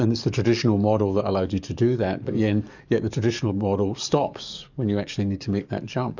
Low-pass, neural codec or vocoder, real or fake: 7.2 kHz; codec, 44.1 kHz, 7.8 kbps, DAC; fake